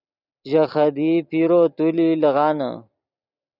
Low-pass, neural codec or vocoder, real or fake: 5.4 kHz; vocoder, 44.1 kHz, 128 mel bands every 256 samples, BigVGAN v2; fake